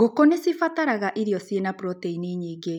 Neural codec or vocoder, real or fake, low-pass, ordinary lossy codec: none; real; 19.8 kHz; none